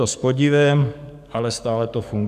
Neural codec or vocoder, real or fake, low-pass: codec, 44.1 kHz, 7.8 kbps, DAC; fake; 14.4 kHz